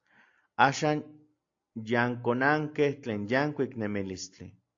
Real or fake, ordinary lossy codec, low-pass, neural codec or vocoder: real; AAC, 48 kbps; 7.2 kHz; none